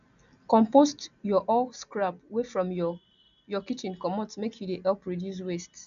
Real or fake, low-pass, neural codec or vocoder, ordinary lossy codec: real; 7.2 kHz; none; none